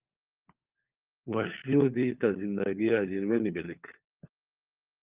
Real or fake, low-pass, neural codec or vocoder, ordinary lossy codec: fake; 3.6 kHz; codec, 16 kHz, 4 kbps, FunCodec, trained on LibriTTS, 50 frames a second; Opus, 24 kbps